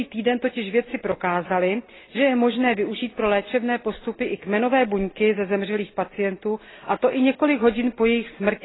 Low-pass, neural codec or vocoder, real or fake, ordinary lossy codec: 7.2 kHz; none; real; AAC, 16 kbps